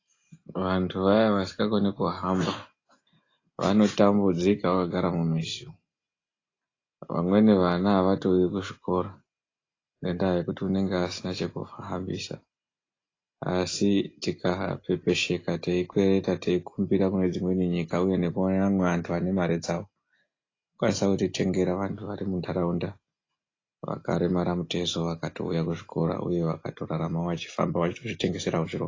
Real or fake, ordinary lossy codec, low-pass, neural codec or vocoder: real; AAC, 32 kbps; 7.2 kHz; none